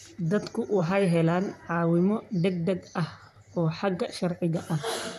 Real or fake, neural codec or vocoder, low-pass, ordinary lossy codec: fake; vocoder, 44.1 kHz, 128 mel bands, Pupu-Vocoder; 14.4 kHz; none